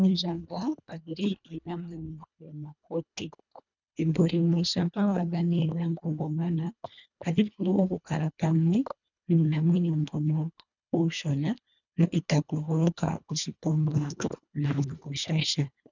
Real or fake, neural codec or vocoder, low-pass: fake; codec, 24 kHz, 1.5 kbps, HILCodec; 7.2 kHz